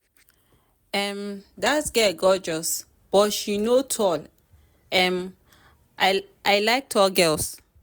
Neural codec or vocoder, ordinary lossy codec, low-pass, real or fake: none; none; none; real